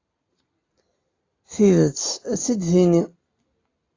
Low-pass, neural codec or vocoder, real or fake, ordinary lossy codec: 7.2 kHz; none; real; AAC, 32 kbps